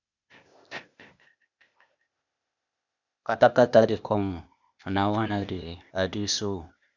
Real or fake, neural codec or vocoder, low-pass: fake; codec, 16 kHz, 0.8 kbps, ZipCodec; 7.2 kHz